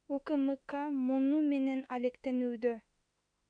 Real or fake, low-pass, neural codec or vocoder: fake; 9.9 kHz; autoencoder, 48 kHz, 32 numbers a frame, DAC-VAE, trained on Japanese speech